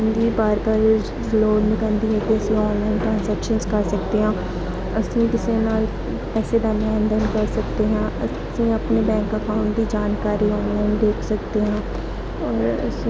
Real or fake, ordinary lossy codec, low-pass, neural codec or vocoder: real; none; none; none